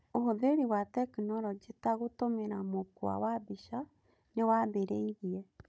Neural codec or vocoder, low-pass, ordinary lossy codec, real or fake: codec, 16 kHz, 16 kbps, FunCodec, trained on Chinese and English, 50 frames a second; none; none; fake